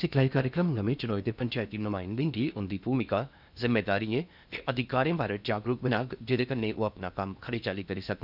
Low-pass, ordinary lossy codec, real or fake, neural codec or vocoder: 5.4 kHz; none; fake; codec, 16 kHz in and 24 kHz out, 0.8 kbps, FocalCodec, streaming, 65536 codes